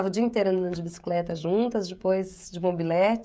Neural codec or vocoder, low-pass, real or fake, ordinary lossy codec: codec, 16 kHz, 16 kbps, FreqCodec, smaller model; none; fake; none